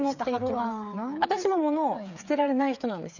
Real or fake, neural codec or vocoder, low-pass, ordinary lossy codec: fake; codec, 16 kHz, 8 kbps, FreqCodec, smaller model; 7.2 kHz; none